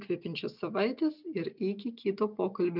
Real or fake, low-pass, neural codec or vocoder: real; 5.4 kHz; none